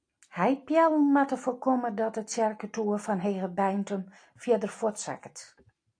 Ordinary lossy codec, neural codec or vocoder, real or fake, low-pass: AAC, 48 kbps; none; real; 9.9 kHz